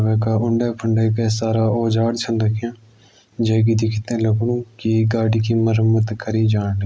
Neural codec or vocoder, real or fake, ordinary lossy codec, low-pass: none; real; none; none